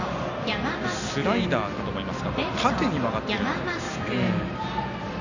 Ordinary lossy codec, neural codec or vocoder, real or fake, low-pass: none; none; real; 7.2 kHz